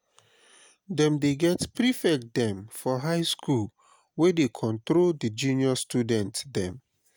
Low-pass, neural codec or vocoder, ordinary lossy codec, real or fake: none; none; none; real